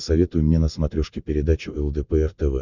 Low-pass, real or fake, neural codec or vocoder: 7.2 kHz; real; none